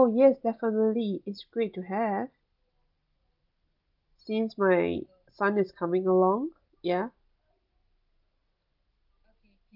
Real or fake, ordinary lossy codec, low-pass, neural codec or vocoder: real; Opus, 24 kbps; 5.4 kHz; none